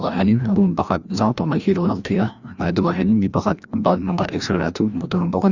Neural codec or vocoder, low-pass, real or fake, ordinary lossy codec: codec, 16 kHz, 1 kbps, FreqCodec, larger model; 7.2 kHz; fake; Opus, 64 kbps